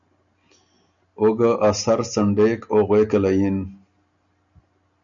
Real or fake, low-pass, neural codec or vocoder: real; 7.2 kHz; none